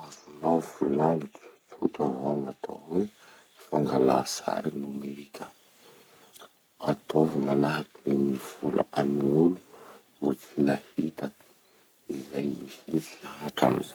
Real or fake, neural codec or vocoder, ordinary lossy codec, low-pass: fake; codec, 44.1 kHz, 3.4 kbps, Pupu-Codec; none; none